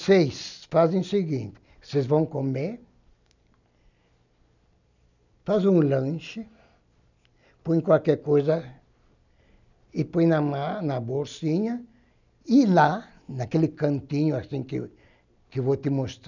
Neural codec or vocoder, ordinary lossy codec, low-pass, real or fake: none; none; 7.2 kHz; real